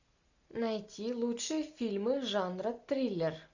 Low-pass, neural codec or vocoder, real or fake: 7.2 kHz; none; real